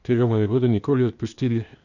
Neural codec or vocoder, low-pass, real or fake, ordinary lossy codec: codec, 16 kHz, 0.8 kbps, ZipCodec; 7.2 kHz; fake; none